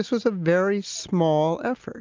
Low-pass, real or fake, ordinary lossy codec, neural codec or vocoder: 7.2 kHz; real; Opus, 24 kbps; none